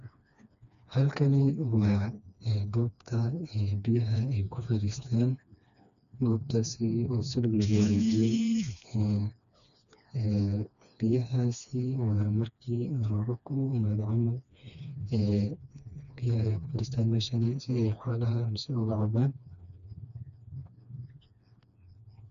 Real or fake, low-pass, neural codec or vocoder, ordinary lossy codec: fake; 7.2 kHz; codec, 16 kHz, 2 kbps, FreqCodec, smaller model; none